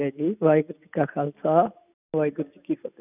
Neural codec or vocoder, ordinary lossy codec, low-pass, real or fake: none; none; 3.6 kHz; real